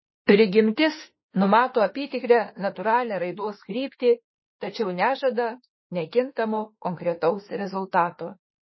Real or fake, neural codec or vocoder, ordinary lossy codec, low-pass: fake; autoencoder, 48 kHz, 32 numbers a frame, DAC-VAE, trained on Japanese speech; MP3, 24 kbps; 7.2 kHz